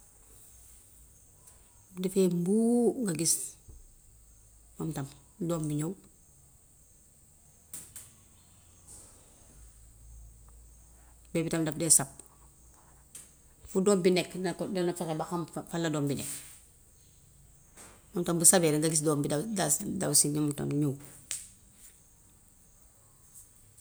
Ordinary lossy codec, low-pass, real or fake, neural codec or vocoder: none; none; real; none